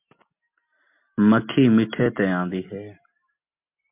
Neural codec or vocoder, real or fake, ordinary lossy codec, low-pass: none; real; MP3, 32 kbps; 3.6 kHz